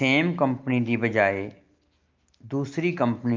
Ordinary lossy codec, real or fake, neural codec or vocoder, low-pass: Opus, 24 kbps; real; none; 7.2 kHz